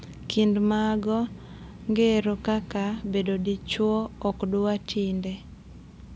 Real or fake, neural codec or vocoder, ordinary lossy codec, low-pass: real; none; none; none